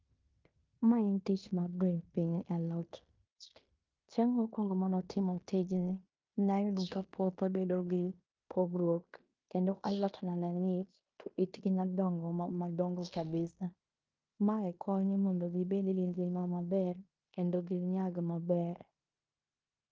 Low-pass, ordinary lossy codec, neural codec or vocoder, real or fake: 7.2 kHz; Opus, 24 kbps; codec, 16 kHz in and 24 kHz out, 0.9 kbps, LongCat-Audio-Codec, fine tuned four codebook decoder; fake